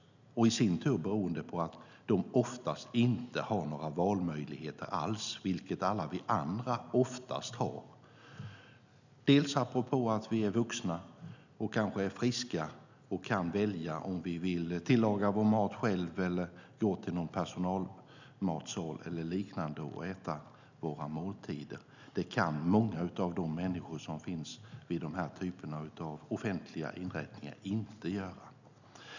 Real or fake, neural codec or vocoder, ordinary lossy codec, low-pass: real; none; none; 7.2 kHz